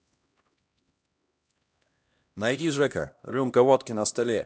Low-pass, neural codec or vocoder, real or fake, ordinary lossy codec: none; codec, 16 kHz, 1 kbps, X-Codec, HuBERT features, trained on LibriSpeech; fake; none